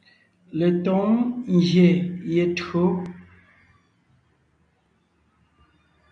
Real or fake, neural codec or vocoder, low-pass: real; none; 9.9 kHz